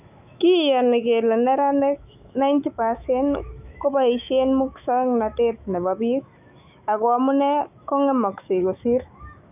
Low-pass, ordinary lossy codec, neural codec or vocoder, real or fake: 3.6 kHz; none; autoencoder, 48 kHz, 128 numbers a frame, DAC-VAE, trained on Japanese speech; fake